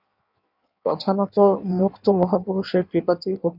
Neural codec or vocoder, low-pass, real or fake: codec, 16 kHz in and 24 kHz out, 1.1 kbps, FireRedTTS-2 codec; 5.4 kHz; fake